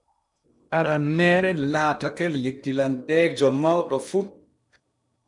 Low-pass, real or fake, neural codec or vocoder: 10.8 kHz; fake; codec, 16 kHz in and 24 kHz out, 0.8 kbps, FocalCodec, streaming, 65536 codes